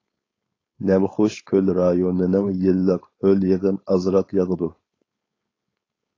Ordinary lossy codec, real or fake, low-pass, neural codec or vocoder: AAC, 32 kbps; fake; 7.2 kHz; codec, 16 kHz, 4.8 kbps, FACodec